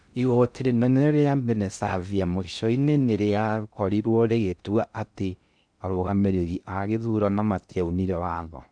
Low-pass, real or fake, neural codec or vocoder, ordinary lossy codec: 9.9 kHz; fake; codec, 16 kHz in and 24 kHz out, 0.6 kbps, FocalCodec, streaming, 2048 codes; none